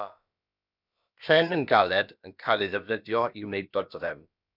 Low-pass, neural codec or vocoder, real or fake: 5.4 kHz; codec, 16 kHz, about 1 kbps, DyCAST, with the encoder's durations; fake